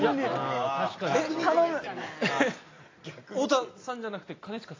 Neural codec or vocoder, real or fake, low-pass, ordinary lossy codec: none; real; 7.2 kHz; AAC, 32 kbps